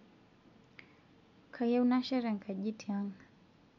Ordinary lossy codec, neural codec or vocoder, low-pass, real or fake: none; none; 7.2 kHz; real